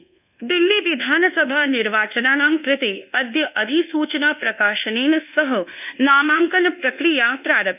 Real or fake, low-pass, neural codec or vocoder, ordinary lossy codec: fake; 3.6 kHz; codec, 24 kHz, 1.2 kbps, DualCodec; none